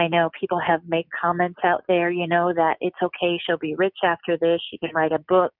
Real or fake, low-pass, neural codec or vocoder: real; 5.4 kHz; none